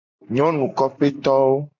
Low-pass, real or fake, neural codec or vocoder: 7.2 kHz; real; none